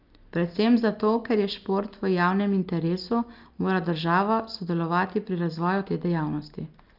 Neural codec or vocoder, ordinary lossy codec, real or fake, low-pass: none; Opus, 32 kbps; real; 5.4 kHz